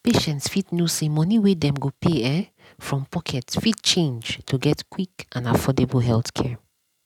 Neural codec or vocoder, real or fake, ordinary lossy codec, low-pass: none; real; none; 19.8 kHz